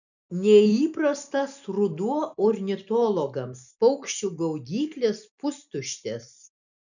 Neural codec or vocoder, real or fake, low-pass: none; real; 7.2 kHz